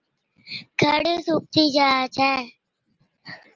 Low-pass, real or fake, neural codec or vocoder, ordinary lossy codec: 7.2 kHz; real; none; Opus, 24 kbps